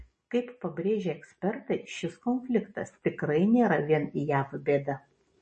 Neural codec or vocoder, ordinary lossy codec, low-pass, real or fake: none; MP3, 32 kbps; 10.8 kHz; real